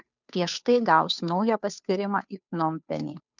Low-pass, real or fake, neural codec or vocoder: 7.2 kHz; fake; codec, 16 kHz, 2 kbps, FunCodec, trained on Chinese and English, 25 frames a second